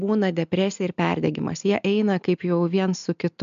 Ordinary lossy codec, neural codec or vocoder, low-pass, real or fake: MP3, 64 kbps; none; 7.2 kHz; real